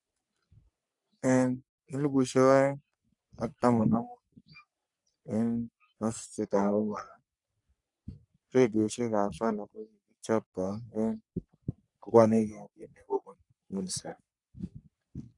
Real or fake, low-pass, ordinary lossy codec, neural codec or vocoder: fake; 10.8 kHz; none; codec, 44.1 kHz, 3.4 kbps, Pupu-Codec